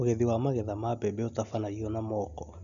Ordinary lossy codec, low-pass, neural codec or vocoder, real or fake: none; 7.2 kHz; none; real